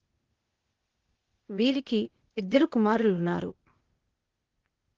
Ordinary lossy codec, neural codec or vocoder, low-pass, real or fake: Opus, 16 kbps; codec, 16 kHz, 0.8 kbps, ZipCodec; 7.2 kHz; fake